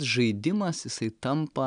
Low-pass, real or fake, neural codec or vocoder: 9.9 kHz; real; none